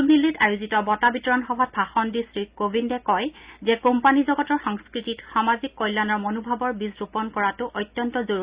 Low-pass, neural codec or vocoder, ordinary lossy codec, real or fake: 3.6 kHz; none; Opus, 64 kbps; real